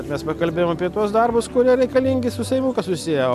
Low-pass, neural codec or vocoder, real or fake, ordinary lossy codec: 14.4 kHz; none; real; MP3, 96 kbps